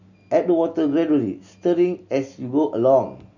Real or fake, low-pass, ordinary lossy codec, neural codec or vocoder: real; 7.2 kHz; none; none